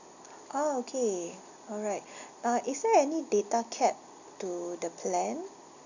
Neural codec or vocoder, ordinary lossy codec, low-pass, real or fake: none; none; 7.2 kHz; real